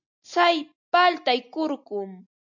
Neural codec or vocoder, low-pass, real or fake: none; 7.2 kHz; real